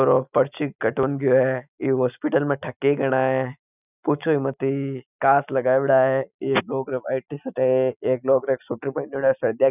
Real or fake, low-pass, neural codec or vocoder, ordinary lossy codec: real; 3.6 kHz; none; none